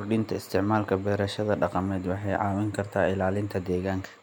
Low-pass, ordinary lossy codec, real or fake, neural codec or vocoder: 19.8 kHz; MP3, 96 kbps; real; none